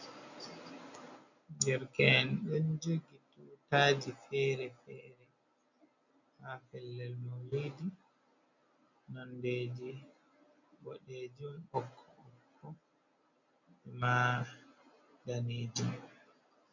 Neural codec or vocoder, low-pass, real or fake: none; 7.2 kHz; real